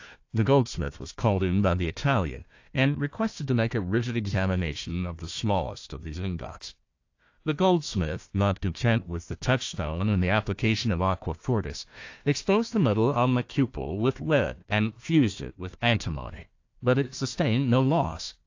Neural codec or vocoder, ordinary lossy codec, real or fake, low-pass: codec, 16 kHz, 1 kbps, FunCodec, trained on Chinese and English, 50 frames a second; AAC, 48 kbps; fake; 7.2 kHz